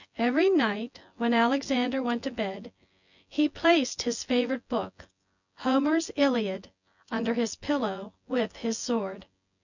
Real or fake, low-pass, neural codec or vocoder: fake; 7.2 kHz; vocoder, 24 kHz, 100 mel bands, Vocos